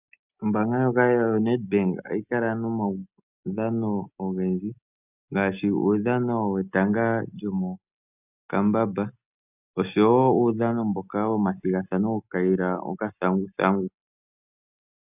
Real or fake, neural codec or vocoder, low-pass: real; none; 3.6 kHz